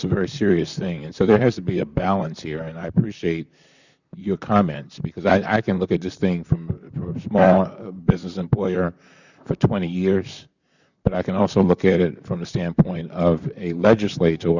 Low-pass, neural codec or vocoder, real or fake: 7.2 kHz; vocoder, 44.1 kHz, 128 mel bands, Pupu-Vocoder; fake